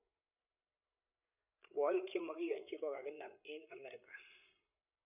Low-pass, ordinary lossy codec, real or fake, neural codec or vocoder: 3.6 kHz; none; fake; codec, 16 kHz, 8 kbps, FreqCodec, larger model